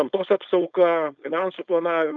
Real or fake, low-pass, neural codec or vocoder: fake; 7.2 kHz; codec, 16 kHz, 4.8 kbps, FACodec